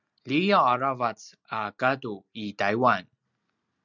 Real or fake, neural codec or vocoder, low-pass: real; none; 7.2 kHz